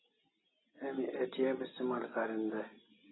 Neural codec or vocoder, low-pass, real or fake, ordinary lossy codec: none; 7.2 kHz; real; AAC, 16 kbps